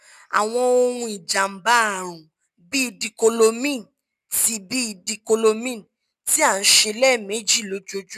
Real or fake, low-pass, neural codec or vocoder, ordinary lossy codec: real; 14.4 kHz; none; none